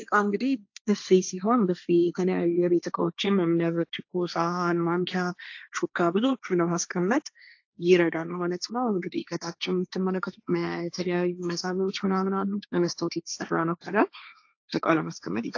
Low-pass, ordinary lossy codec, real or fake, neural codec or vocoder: 7.2 kHz; AAC, 48 kbps; fake; codec, 16 kHz, 1.1 kbps, Voila-Tokenizer